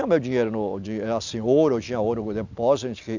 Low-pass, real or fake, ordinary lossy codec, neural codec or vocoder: 7.2 kHz; real; none; none